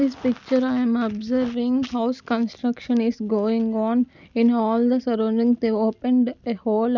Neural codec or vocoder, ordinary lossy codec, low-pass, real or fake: none; none; 7.2 kHz; real